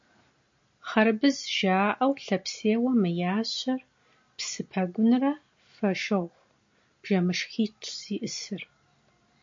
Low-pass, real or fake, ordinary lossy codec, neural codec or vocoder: 7.2 kHz; real; MP3, 48 kbps; none